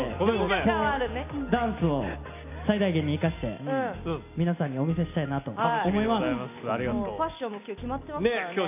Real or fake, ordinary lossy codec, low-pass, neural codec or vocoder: real; MP3, 32 kbps; 3.6 kHz; none